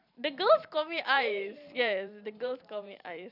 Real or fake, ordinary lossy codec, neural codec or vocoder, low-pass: fake; none; vocoder, 44.1 kHz, 128 mel bands every 512 samples, BigVGAN v2; 5.4 kHz